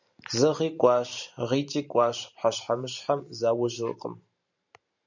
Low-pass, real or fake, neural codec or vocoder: 7.2 kHz; fake; vocoder, 44.1 kHz, 128 mel bands every 512 samples, BigVGAN v2